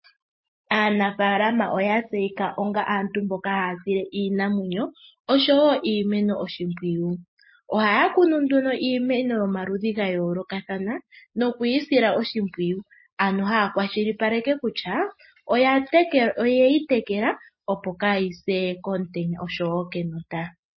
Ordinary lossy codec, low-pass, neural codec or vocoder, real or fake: MP3, 24 kbps; 7.2 kHz; none; real